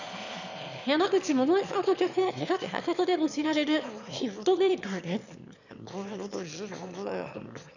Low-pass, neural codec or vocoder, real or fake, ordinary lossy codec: 7.2 kHz; autoencoder, 22.05 kHz, a latent of 192 numbers a frame, VITS, trained on one speaker; fake; none